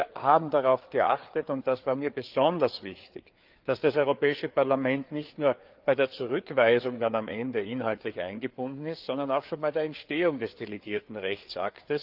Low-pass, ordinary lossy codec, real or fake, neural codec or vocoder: 5.4 kHz; Opus, 32 kbps; fake; codec, 16 kHz, 4 kbps, FreqCodec, larger model